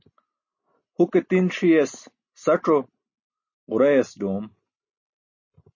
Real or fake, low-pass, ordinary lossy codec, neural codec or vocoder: real; 7.2 kHz; MP3, 32 kbps; none